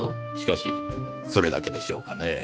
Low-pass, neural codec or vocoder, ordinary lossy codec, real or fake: none; codec, 16 kHz, 2 kbps, X-Codec, HuBERT features, trained on balanced general audio; none; fake